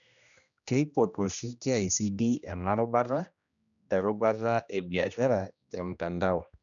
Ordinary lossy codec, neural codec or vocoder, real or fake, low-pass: none; codec, 16 kHz, 1 kbps, X-Codec, HuBERT features, trained on general audio; fake; 7.2 kHz